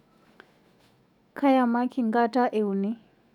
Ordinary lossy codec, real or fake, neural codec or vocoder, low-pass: none; fake; autoencoder, 48 kHz, 128 numbers a frame, DAC-VAE, trained on Japanese speech; 19.8 kHz